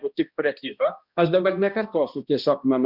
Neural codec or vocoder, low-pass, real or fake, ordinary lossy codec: codec, 16 kHz, 1 kbps, X-Codec, HuBERT features, trained on balanced general audio; 5.4 kHz; fake; Opus, 64 kbps